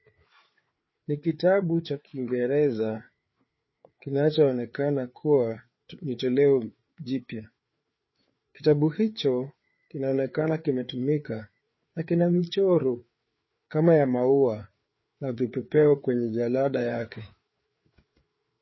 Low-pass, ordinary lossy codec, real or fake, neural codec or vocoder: 7.2 kHz; MP3, 24 kbps; fake; codec, 16 kHz, 4 kbps, FreqCodec, larger model